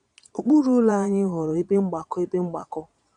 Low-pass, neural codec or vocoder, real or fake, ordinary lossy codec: 9.9 kHz; vocoder, 22.05 kHz, 80 mel bands, Vocos; fake; none